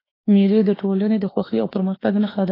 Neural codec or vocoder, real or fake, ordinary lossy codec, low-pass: autoencoder, 48 kHz, 32 numbers a frame, DAC-VAE, trained on Japanese speech; fake; AAC, 24 kbps; 5.4 kHz